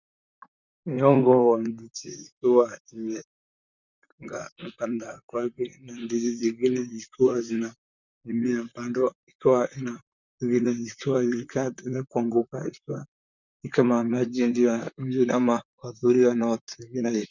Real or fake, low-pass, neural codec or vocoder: fake; 7.2 kHz; vocoder, 44.1 kHz, 128 mel bands, Pupu-Vocoder